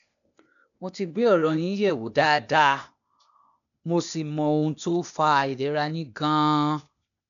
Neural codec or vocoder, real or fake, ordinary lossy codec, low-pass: codec, 16 kHz, 0.8 kbps, ZipCodec; fake; none; 7.2 kHz